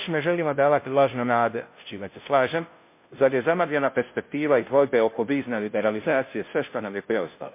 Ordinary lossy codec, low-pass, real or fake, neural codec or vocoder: MP3, 24 kbps; 3.6 kHz; fake; codec, 16 kHz, 0.5 kbps, FunCodec, trained on Chinese and English, 25 frames a second